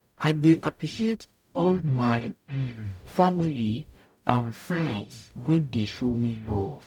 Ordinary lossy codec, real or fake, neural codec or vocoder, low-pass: none; fake; codec, 44.1 kHz, 0.9 kbps, DAC; 19.8 kHz